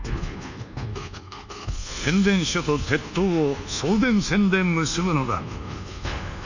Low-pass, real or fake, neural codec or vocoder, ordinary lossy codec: 7.2 kHz; fake; codec, 24 kHz, 1.2 kbps, DualCodec; none